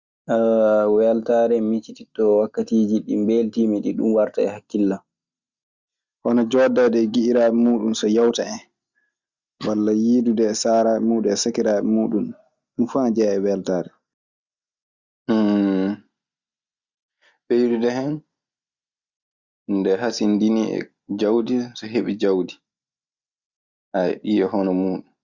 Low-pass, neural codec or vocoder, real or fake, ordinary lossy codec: 7.2 kHz; none; real; Opus, 64 kbps